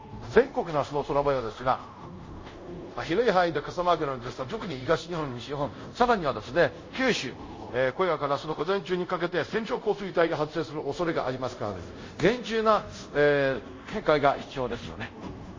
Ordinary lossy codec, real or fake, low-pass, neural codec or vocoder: MP3, 32 kbps; fake; 7.2 kHz; codec, 24 kHz, 0.5 kbps, DualCodec